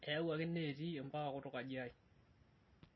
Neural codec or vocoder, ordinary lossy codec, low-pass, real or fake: none; MP3, 24 kbps; 7.2 kHz; real